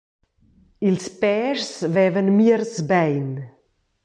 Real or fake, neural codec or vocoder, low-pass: real; none; 9.9 kHz